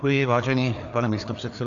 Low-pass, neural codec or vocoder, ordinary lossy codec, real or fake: 7.2 kHz; codec, 16 kHz, 4 kbps, FreqCodec, larger model; AAC, 64 kbps; fake